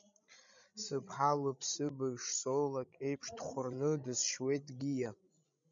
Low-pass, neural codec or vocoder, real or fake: 7.2 kHz; codec, 16 kHz, 16 kbps, FreqCodec, larger model; fake